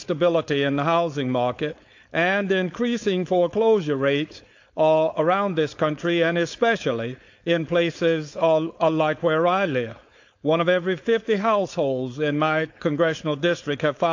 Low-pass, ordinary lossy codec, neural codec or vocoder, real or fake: 7.2 kHz; AAC, 48 kbps; codec, 16 kHz, 4.8 kbps, FACodec; fake